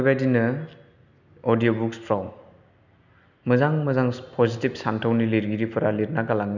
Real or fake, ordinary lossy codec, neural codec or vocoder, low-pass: real; none; none; 7.2 kHz